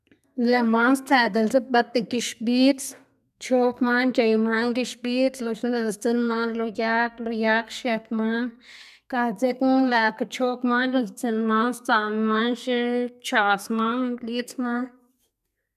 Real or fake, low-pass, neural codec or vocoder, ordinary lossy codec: fake; 14.4 kHz; codec, 32 kHz, 1.9 kbps, SNAC; none